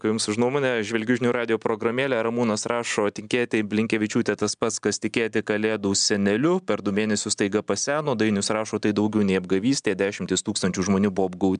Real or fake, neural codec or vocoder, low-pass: real; none; 9.9 kHz